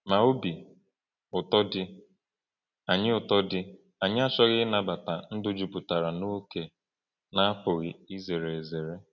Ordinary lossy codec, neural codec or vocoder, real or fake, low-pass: none; none; real; 7.2 kHz